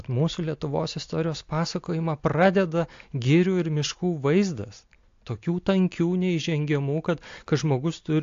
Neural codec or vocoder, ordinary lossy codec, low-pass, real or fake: none; AAC, 48 kbps; 7.2 kHz; real